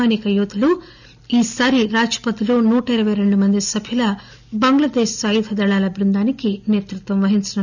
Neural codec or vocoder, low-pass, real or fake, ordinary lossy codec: none; 7.2 kHz; real; none